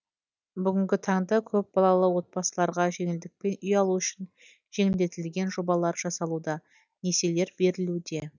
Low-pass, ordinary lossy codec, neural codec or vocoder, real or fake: 7.2 kHz; none; none; real